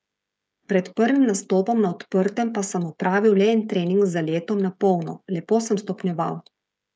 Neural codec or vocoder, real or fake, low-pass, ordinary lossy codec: codec, 16 kHz, 16 kbps, FreqCodec, smaller model; fake; none; none